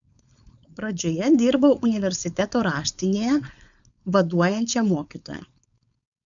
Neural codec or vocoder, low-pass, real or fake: codec, 16 kHz, 4.8 kbps, FACodec; 7.2 kHz; fake